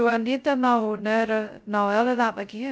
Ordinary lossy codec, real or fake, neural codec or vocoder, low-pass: none; fake; codec, 16 kHz, 0.2 kbps, FocalCodec; none